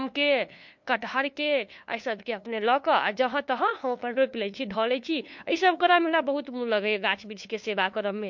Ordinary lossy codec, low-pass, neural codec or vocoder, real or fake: MP3, 48 kbps; 7.2 kHz; codec, 16 kHz, 2 kbps, FunCodec, trained on LibriTTS, 25 frames a second; fake